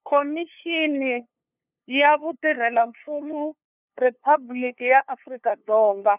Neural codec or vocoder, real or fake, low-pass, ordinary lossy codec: codec, 16 kHz, 2 kbps, FunCodec, trained on LibriTTS, 25 frames a second; fake; 3.6 kHz; none